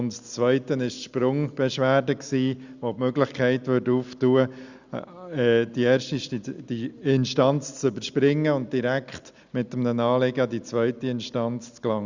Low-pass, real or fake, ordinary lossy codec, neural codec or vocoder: 7.2 kHz; real; Opus, 64 kbps; none